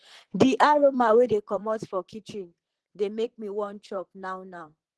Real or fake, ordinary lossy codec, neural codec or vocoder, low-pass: fake; Opus, 16 kbps; vocoder, 44.1 kHz, 128 mel bands, Pupu-Vocoder; 10.8 kHz